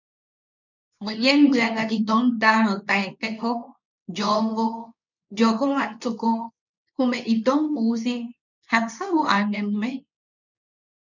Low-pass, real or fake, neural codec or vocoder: 7.2 kHz; fake; codec, 24 kHz, 0.9 kbps, WavTokenizer, medium speech release version 2